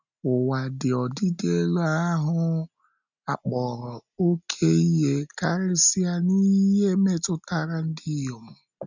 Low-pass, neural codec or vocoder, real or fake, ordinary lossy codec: 7.2 kHz; none; real; none